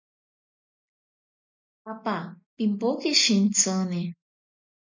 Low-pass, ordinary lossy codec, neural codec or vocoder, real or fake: 7.2 kHz; MP3, 48 kbps; none; real